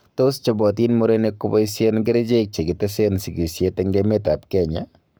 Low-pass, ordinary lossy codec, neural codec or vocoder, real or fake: none; none; codec, 44.1 kHz, 7.8 kbps, Pupu-Codec; fake